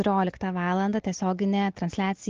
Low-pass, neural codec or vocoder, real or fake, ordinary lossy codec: 7.2 kHz; none; real; Opus, 16 kbps